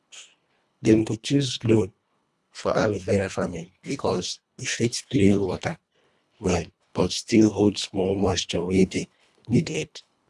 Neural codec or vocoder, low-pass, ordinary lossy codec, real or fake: codec, 24 kHz, 1.5 kbps, HILCodec; none; none; fake